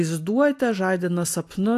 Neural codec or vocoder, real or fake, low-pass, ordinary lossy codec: none; real; 14.4 kHz; AAC, 64 kbps